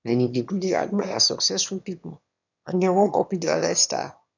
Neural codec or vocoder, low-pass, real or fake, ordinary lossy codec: autoencoder, 22.05 kHz, a latent of 192 numbers a frame, VITS, trained on one speaker; 7.2 kHz; fake; none